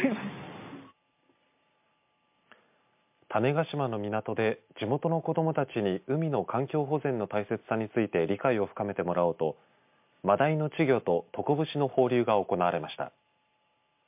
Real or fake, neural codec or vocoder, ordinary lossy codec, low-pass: real; none; MP3, 32 kbps; 3.6 kHz